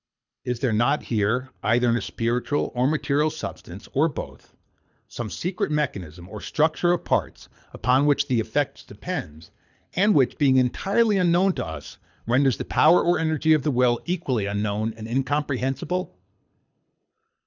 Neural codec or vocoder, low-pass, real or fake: codec, 24 kHz, 6 kbps, HILCodec; 7.2 kHz; fake